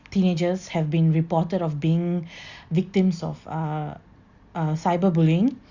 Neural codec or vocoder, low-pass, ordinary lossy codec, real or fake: none; 7.2 kHz; Opus, 64 kbps; real